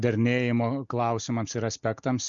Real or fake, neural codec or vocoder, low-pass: real; none; 7.2 kHz